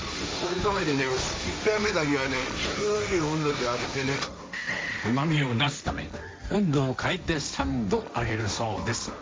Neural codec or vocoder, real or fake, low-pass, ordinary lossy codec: codec, 16 kHz, 1.1 kbps, Voila-Tokenizer; fake; none; none